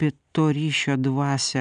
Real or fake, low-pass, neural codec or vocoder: real; 9.9 kHz; none